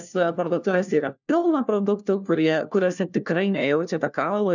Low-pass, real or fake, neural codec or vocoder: 7.2 kHz; fake; codec, 16 kHz, 1 kbps, FunCodec, trained on LibriTTS, 50 frames a second